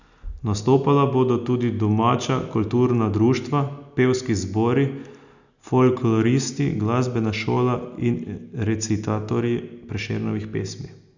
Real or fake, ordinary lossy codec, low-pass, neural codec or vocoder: real; none; 7.2 kHz; none